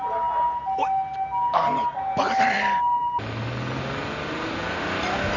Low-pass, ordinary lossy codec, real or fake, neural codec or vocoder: 7.2 kHz; none; real; none